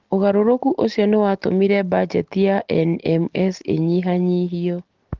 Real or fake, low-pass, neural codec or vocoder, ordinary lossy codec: real; 7.2 kHz; none; Opus, 16 kbps